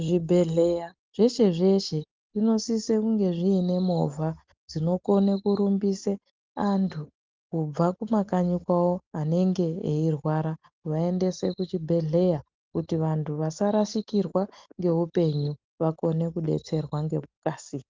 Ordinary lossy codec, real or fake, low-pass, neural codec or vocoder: Opus, 32 kbps; real; 7.2 kHz; none